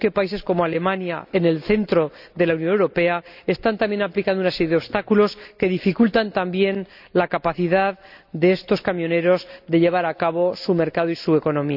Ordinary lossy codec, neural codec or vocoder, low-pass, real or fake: none; none; 5.4 kHz; real